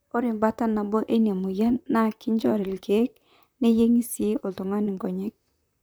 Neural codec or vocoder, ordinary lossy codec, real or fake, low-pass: vocoder, 44.1 kHz, 128 mel bands, Pupu-Vocoder; none; fake; none